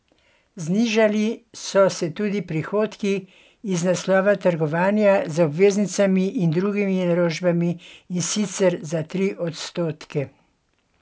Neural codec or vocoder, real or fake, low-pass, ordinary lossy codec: none; real; none; none